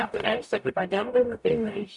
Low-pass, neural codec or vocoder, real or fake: 10.8 kHz; codec, 44.1 kHz, 0.9 kbps, DAC; fake